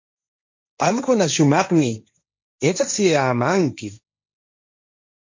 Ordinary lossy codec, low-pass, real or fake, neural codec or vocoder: MP3, 48 kbps; 7.2 kHz; fake; codec, 16 kHz, 1.1 kbps, Voila-Tokenizer